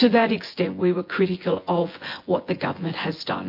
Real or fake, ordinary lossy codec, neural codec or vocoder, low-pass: fake; MP3, 32 kbps; vocoder, 24 kHz, 100 mel bands, Vocos; 5.4 kHz